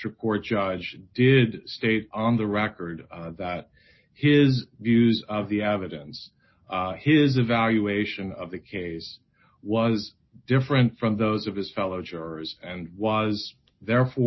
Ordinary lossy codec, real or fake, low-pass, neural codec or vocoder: MP3, 24 kbps; real; 7.2 kHz; none